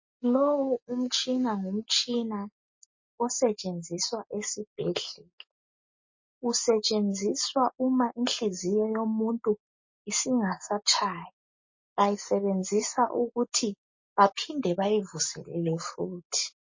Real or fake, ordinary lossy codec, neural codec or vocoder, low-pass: real; MP3, 32 kbps; none; 7.2 kHz